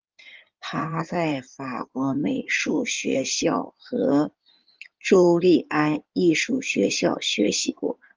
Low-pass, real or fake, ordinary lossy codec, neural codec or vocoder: 7.2 kHz; fake; Opus, 32 kbps; codec, 16 kHz in and 24 kHz out, 2.2 kbps, FireRedTTS-2 codec